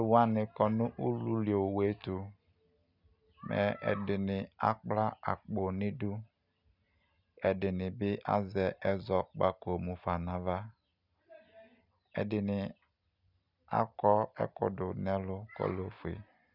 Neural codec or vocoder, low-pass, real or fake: none; 5.4 kHz; real